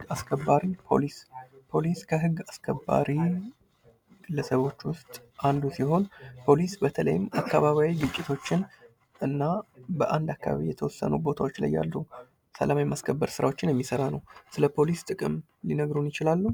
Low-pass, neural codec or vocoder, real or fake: 19.8 kHz; none; real